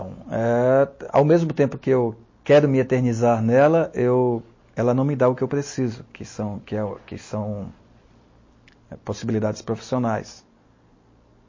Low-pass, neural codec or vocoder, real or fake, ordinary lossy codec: 7.2 kHz; none; real; MP3, 32 kbps